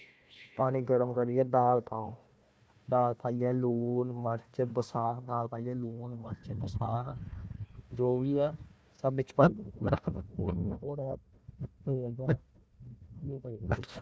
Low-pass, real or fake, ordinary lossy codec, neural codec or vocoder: none; fake; none; codec, 16 kHz, 1 kbps, FunCodec, trained on Chinese and English, 50 frames a second